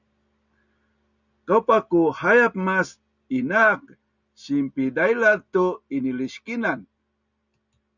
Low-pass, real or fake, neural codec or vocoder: 7.2 kHz; real; none